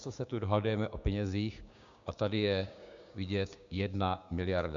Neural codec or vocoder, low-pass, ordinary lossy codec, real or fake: codec, 16 kHz, 6 kbps, DAC; 7.2 kHz; MP3, 64 kbps; fake